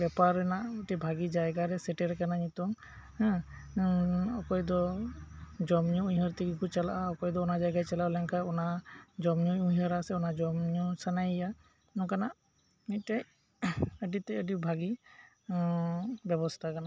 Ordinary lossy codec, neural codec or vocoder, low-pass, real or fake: none; none; none; real